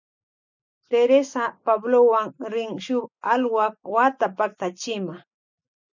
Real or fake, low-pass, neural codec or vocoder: real; 7.2 kHz; none